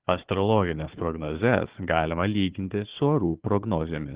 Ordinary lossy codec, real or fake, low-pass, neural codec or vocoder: Opus, 16 kbps; fake; 3.6 kHz; codec, 16 kHz, 4 kbps, FunCodec, trained on Chinese and English, 50 frames a second